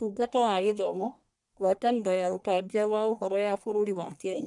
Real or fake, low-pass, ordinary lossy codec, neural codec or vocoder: fake; 10.8 kHz; none; codec, 44.1 kHz, 1.7 kbps, Pupu-Codec